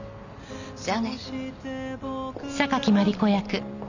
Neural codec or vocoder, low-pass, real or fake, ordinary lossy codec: none; 7.2 kHz; real; none